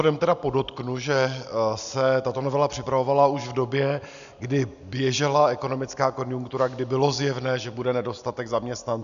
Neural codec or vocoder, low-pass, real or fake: none; 7.2 kHz; real